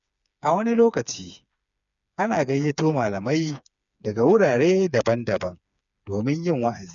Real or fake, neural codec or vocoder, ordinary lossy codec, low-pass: fake; codec, 16 kHz, 4 kbps, FreqCodec, smaller model; none; 7.2 kHz